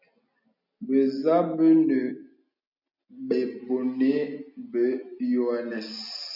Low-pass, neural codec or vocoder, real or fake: 5.4 kHz; none; real